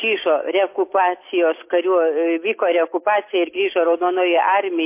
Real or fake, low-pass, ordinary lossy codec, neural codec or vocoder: real; 3.6 kHz; MP3, 24 kbps; none